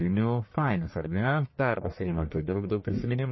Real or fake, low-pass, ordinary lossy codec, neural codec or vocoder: fake; 7.2 kHz; MP3, 24 kbps; codec, 44.1 kHz, 1.7 kbps, Pupu-Codec